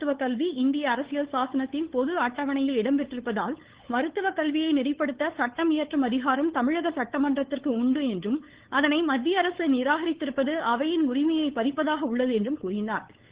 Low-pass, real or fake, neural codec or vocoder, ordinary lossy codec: 3.6 kHz; fake; codec, 16 kHz, 8 kbps, FunCodec, trained on LibriTTS, 25 frames a second; Opus, 16 kbps